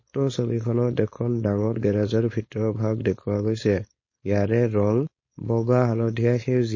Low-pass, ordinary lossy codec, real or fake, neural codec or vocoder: 7.2 kHz; MP3, 32 kbps; fake; codec, 16 kHz, 4.8 kbps, FACodec